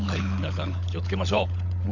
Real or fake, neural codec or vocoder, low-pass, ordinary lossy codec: fake; codec, 16 kHz, 16 kbps, FunCodec, trained on LibriTTS, 50 frames a second; 7.2 kHz; none